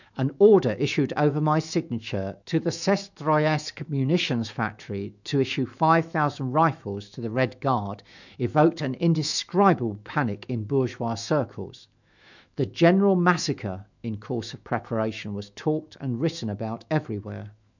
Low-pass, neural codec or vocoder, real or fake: 7.2 kHz; autoencoder, 48 kHz, 128 numbers a frame, DAC-VAE, trained on Japanese speech; fake